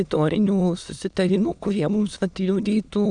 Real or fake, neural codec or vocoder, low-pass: fake; autoencoder, 22.05 kHz, a latent of 192 numbers a frame, VITS, trained on many speakers; 9.9 kHz